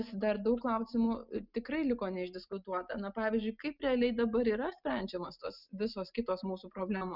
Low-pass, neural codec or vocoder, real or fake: 5.4 kHz; none; real